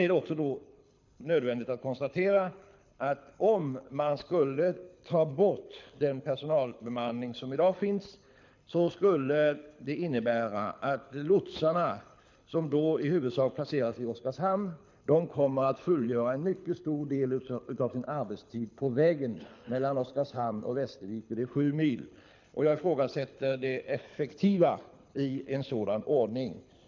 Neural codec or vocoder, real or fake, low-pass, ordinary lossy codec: codec, 24 kHz, 6 kbps, HILCodec; fake; 7.2 kHz; MP3, 64 kbps